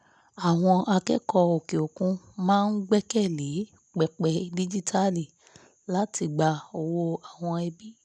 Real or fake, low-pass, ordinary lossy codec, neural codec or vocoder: real; none; none; none